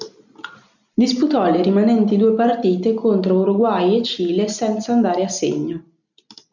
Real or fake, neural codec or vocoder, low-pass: real; none; 7.2 kHz